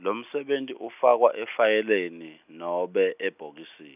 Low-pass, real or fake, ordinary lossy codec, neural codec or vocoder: 3.6 kHz; real; none; none